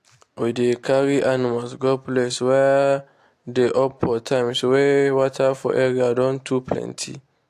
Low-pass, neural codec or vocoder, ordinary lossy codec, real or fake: 14.4 kHz; none; AAC, 64 kbps; real